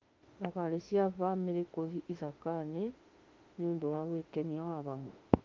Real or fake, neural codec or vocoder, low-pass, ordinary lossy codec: fake; autoencoder, 48 kHz, 32 numbers a frame, DAC-VAE, trained on Japanese speech; 7.2 kHz; Opus, 32 kbps